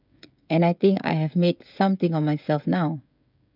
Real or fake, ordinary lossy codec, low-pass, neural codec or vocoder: fake; none; 5.4 kHz; codec, 16 kHz, 16 kbps, FreqCodec, smaller model